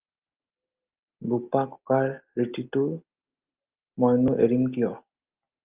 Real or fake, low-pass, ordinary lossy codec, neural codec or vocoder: real; 3.6 kHz; Opus, 24 kbps; none